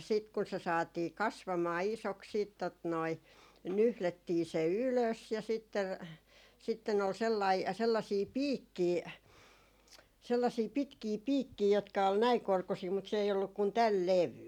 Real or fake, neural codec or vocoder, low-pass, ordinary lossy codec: real; none; 19.8 kHz; none